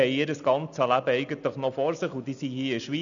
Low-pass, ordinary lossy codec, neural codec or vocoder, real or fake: 7.2 kHz; none; none; real